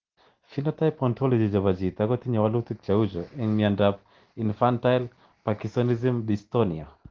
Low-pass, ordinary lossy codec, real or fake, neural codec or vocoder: 7.2 kHz; Opus, 16 kbps; real; none